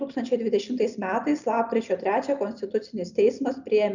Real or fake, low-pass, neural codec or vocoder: real; 7.2 kHz; none